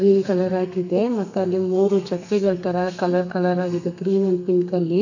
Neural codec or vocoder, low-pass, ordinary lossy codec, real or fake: codec, 32 kHz, 1.9 kbps, SNAC; 7.2 kHz; none; fake